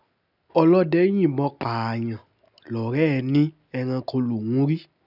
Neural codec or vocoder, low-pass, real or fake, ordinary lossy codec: none; 5.4 kHz; real; none